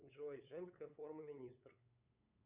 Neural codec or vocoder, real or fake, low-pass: codec, 16 kHz, 8 kbps, FunCodec, trained on LibriTTS, 25 frames a second; fake; 3.6 kHz